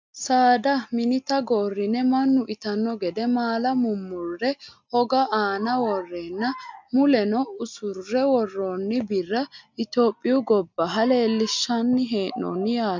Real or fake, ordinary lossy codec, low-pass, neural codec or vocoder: real; MP3, 64 kbps; 7.2 kHz; none